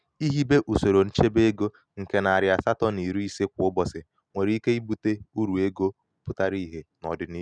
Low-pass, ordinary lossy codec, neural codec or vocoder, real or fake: 9.9 kHz; none; none; real